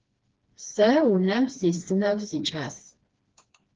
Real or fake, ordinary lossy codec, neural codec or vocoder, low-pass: fake; Opus, 16 kbps; codec, 16 kHz, 2 kbps, FreqCodec, smaller model; 7.2 kHz